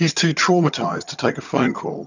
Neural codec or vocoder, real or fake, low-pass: vocoder, 22.05 kHz, 80 mel bands, HiFi-GAN; fake; 7.2 kHz